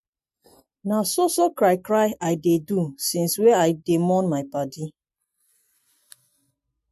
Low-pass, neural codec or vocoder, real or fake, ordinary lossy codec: 14.4 kHz; none; real; MP3, 64 kbps